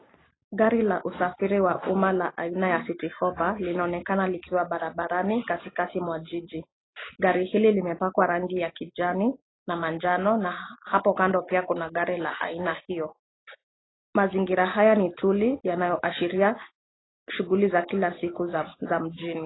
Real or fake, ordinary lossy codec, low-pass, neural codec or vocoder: real; AAC, 16 kbps; 7.2 kHz; none